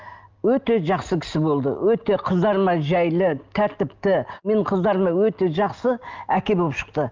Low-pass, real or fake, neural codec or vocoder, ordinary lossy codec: 7.2 kHz; real; none; Opus, 24 kbps